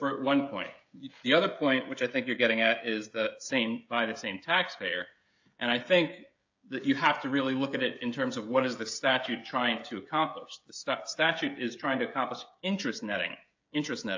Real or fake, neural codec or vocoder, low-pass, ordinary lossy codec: fake; codec, 16 kHz, 16 kbps, FreqCodec, smaller model; 7.2 kHz; AAC, 48 kbps